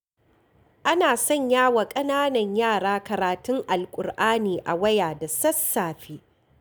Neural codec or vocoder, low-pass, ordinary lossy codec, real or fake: none; none; none; real